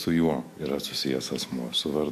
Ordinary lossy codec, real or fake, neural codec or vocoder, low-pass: MP3, 64 kbps; fake; autoencoder, 48 kHz, 128 numbers a frame, DAC-VAE, trained on Japanese speech; 14.4 kHz